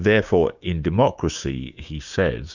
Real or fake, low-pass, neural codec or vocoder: fake; 7.2 kHz; codec, 16 kHz, 6 kbps, DAC